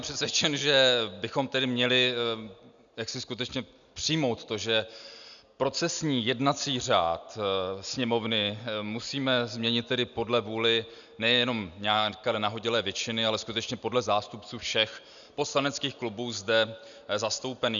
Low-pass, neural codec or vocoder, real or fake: 7.2 kHz; none; real